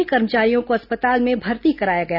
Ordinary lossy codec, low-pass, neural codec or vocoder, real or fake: none; 5.4 kHz; none; real